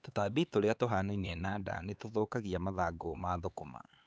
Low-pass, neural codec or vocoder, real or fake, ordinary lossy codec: none; codec, 16 kHz, 4 kbps, X-Codec, HuBERT features, trained on LibriSpeech; fake; none